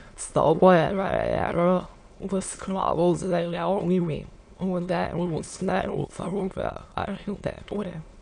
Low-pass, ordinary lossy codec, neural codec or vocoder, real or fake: 9.9 kHz; MP3, 64 kbps; autoencoder, 22.05 kHz, a latent of 192 numbers a frame, VITS, trained on many speakers; fake